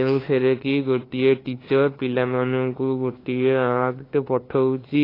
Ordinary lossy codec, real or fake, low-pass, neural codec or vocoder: AAC, 24 kbps; fake; 5.4 kHz; codec, 16 kHz, 2 kbps, FunCodec, trained on LibriTTS, 25 frames a second